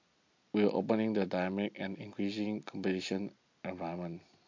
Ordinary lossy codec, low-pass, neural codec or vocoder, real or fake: MP3, 48 kbps; 7.2 kHz; none; real